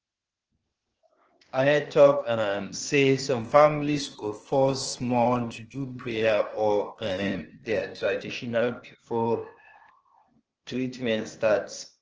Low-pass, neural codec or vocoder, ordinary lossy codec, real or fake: 7.2 kHz; codec, 16 kHz, 0.8 kbps, ZipCodec; Opus, 16 kbps; fake